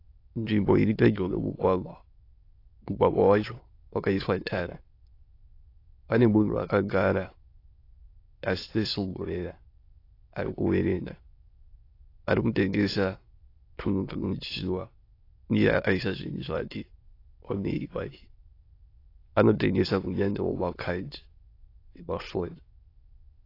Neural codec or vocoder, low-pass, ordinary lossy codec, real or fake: autoencoder, 22.05 kHz, a latent of 192 numbers a frame, VITS, trained on many speakers; 5.4 kHz; AAC, 32 kbps; fake